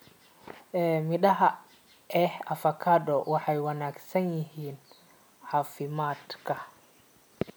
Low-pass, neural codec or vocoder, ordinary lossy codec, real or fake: none; none; none; real